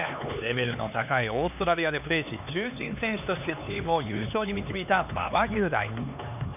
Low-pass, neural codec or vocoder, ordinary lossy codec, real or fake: 3.6 kHz; codec, 16 kHz, 4 kbps, X-Codec, HuBERT features, trained on LibriSpeech; none; fake